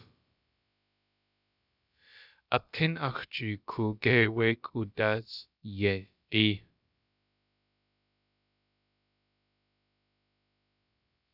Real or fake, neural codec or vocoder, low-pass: fake; codec, 16 kHz, about 1 kbps, DyCAST, with the encoder's durations; 5.4 kHz